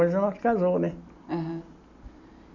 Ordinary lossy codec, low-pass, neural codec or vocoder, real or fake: none; 7.2 kHz; none; real